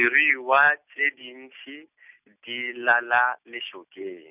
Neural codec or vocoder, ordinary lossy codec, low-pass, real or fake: none; none; 3.6 kHz; real